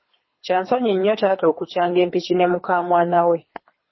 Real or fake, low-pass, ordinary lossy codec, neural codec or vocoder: fake; 7.2 kHz; MP3, 24 kbps; codec, 24 kHz, 3 kbps, HILCodec